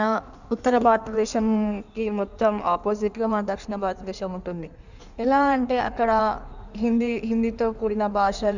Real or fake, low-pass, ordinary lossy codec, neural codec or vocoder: fake; 7.2 kHz; none; codec, 16 kHz in and 24 kHz out, 1.1 kbps, FireRedTTS-2 codec